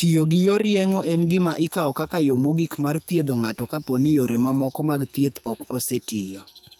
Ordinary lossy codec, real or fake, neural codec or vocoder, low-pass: none; fake; codec, 44.1 kHz, 2.6 kbps, SNAC; none